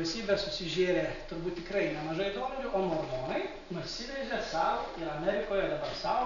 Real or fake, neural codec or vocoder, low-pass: real; none; 7.2 kHz